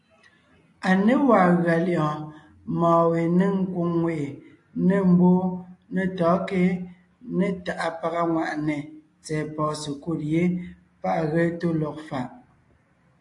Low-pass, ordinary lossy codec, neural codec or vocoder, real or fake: 10.8 kHz; AAC, 48 kbps; none; real